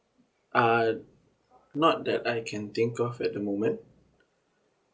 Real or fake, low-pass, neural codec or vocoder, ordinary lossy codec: real; none; none; none